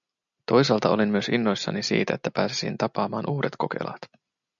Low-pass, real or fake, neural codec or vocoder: 7.2 kHz; real; none